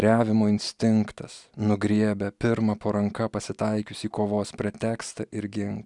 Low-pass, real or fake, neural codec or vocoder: 10.8 kHz; real; none